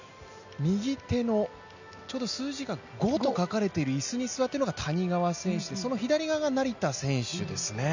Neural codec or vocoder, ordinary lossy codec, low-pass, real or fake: none; none; 7.2 kHz; real